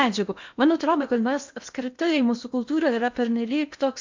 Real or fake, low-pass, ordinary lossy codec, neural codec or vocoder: fake; 7.2 kHz; MP3, 64 kbps; codec, 16 kHz in and 24 kHz out, 0.8 kbps, FocalCodec, streaming, 65536 codes